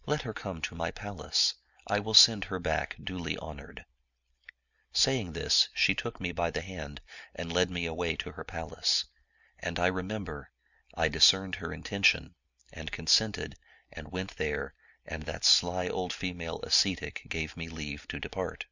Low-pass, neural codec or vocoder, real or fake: 7.2 kHz; none; real